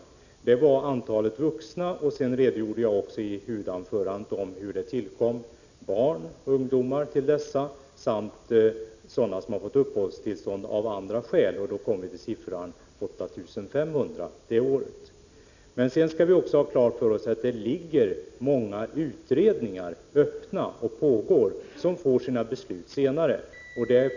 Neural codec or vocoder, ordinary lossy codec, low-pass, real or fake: none; none; 7.2 kHz; real